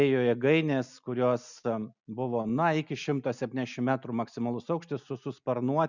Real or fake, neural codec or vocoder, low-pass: real; none; 7.2 kHz